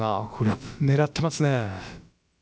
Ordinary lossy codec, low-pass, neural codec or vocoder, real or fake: none; none; codec, 16 kHz, about 1 kbps, DyCAST, with the encoder's durations; fake